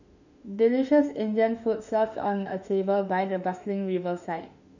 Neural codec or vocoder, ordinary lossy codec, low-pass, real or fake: autoencoder, 48 kHz, 32 numbers a frame, DAC-VAE, trained on Japanese speech; none; 7.2 kHz; fake